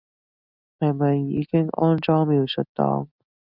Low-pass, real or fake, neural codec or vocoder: 5.4 kHz; real; none